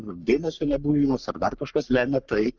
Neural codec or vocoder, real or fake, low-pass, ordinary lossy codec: codec, 44.1 kHz, 3.4 kbps, Pupu-Codec; fake; 7.2 kHz; Opus, 32 kbps